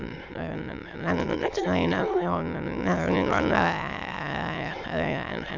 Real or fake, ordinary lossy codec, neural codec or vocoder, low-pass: fake; none; autoencoder, 22.05 kHz, a latent of 192 numbers a frame, VITS, trained on many speakers; 7.2 kHz